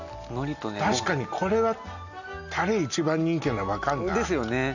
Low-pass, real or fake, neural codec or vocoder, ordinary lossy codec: 7.2 kHz; real; none; none